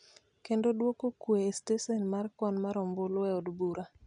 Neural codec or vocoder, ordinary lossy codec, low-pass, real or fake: none; none; 9.9 kHz; real